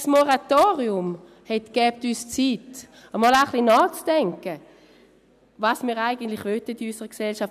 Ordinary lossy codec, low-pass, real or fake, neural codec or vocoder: none; 14.4 kHz; real; none